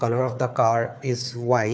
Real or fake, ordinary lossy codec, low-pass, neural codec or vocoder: fake; none; none; codec, 16 kHz, 4 kbps, FunCodec, trained on Chinese and English, 50 frames a second